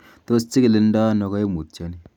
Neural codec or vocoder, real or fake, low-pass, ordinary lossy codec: none; real; 19.8 kHz; none